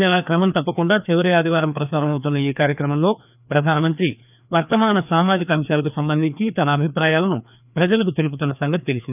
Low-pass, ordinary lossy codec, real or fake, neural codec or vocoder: 3.6 kHz; none; fake; codec, 16 kHz, 2 kbps, FreqCodec, larger model